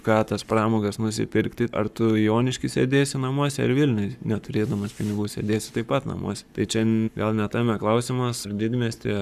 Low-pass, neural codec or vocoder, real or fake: 14.4 kHz; codec, 44.1 kHz, 7.8 kbps, Pupu-Codec; fake